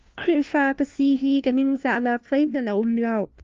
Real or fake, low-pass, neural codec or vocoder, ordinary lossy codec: fake; 7.2 kHz; codec, 16 kHz, 1 kbps, FunCodec, trained on LibriTTS, 50 frames a second; Opus, 32 kbps